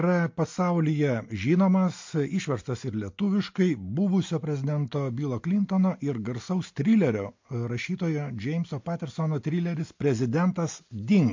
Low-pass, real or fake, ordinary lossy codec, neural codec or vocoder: 7.2 kHz; real; MP3, 48 kbps; none